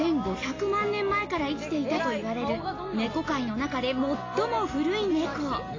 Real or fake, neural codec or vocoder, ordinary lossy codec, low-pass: real; none; AAC, 32 kbps; 7.2 kHz